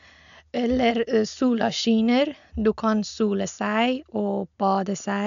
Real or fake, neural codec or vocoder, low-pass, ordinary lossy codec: real; none; 7.2 kHz; MP3, 96 kbps